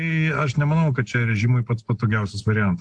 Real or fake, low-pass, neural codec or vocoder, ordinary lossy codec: real; 9.9 kHz; none; AAC, 64 kbps